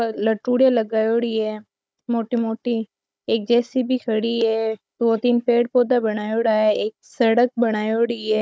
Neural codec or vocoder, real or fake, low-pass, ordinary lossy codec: codec, 16 kHz, 16 kbps, FunCodec, trained on Chinese and English, 50 frames a second; fake; none; none